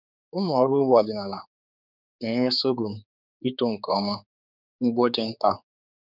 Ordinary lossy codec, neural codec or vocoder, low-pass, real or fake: none; codec, 16 kHz, 4 kbps, X-Codec, HuBERT features, trained on general audio; 5.4 kHz; fake